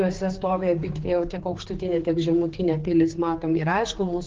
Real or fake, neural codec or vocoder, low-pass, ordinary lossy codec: fake; codec, 16 kHz, 4 kbps, X-Codec, HuBERT features, trained on general audio; 7.2 kHz; Opus, 16 kbps